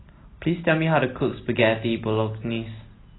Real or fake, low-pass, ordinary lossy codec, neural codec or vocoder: real; 7.2 kHz; AAC, 16 kbps; none